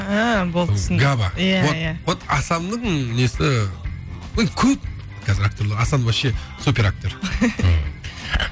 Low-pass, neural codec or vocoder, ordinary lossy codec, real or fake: none; none; none; real